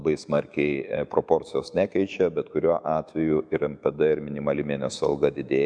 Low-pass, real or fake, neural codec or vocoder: 9.9 kHz; fake; autoencoder, 48 kHz, 128 numbers a frame, DAC-VAE, trained on Japanese speech